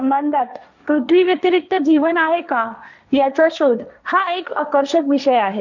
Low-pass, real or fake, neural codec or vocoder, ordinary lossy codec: 7.2 kHz; fake; codec, 16 kHz, 1.1 kbps, Voila-Tokenizer; none